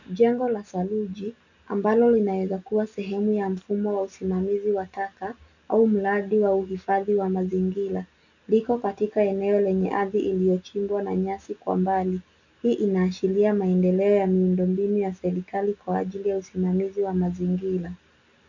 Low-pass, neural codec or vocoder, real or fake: 7.2 kHz; none; real